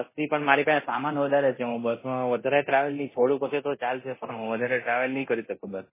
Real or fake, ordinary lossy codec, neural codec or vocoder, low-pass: fake; MP3, 16 kbps; codec, 24 kHz, 0.9 kbps, DualCodec; 3.6 kHz